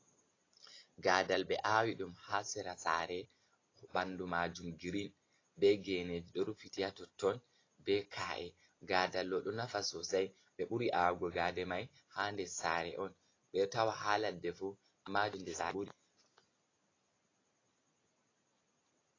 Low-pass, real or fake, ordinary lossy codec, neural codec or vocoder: 7.2 kHz; real; AAC, 32 kbps; none